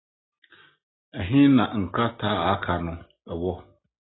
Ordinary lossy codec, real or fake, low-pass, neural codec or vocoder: AAC, 16 kbps; real; 7.2 kHz; none